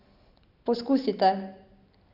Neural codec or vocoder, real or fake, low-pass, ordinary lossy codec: none; real; 5.4 kHz; none